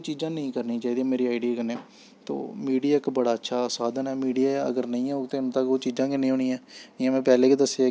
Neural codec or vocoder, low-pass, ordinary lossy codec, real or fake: none; none; none; real